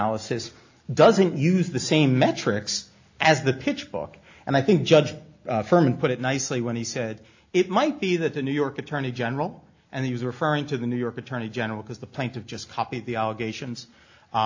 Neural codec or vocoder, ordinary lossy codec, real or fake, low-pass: none; AAC, 48 kbps; real; 7.2 kHz